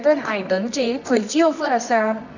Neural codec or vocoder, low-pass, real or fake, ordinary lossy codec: codec, 24 kHz, 0.9 kbps, WavTokenizer, medium music audio release; 7.2 kHz; fake; none